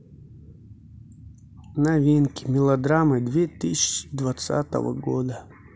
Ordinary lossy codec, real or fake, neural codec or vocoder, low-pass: none; real; none; none